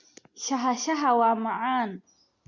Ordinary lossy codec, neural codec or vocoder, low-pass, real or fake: Opus, 64 kbps; none; 7.2 kHz; real